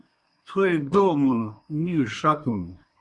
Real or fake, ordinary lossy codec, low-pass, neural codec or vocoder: fake; Opus, 64 kbps; 10.8 kHz; codec, 24 kHz, 1 kbps, SNAC